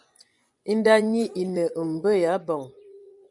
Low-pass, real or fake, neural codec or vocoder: 10.8 kHz; fake; vocoder, 24 kHz, 100 mel bands, Vocos